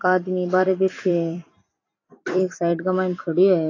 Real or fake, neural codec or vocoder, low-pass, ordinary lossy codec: fake; vocoder, 44.1 kHz, 128 mel bands every 256 samples, BigVGAN v2; 7.2 kHz; AAC, 32 kbps